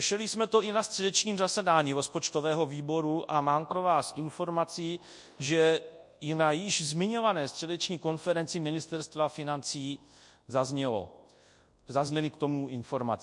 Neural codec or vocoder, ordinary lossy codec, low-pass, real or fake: codec, 24 kHz, 0.9 kbps, WavTokenizer, large speech release; MP3, 48 kbps; 10.8 kHz; fake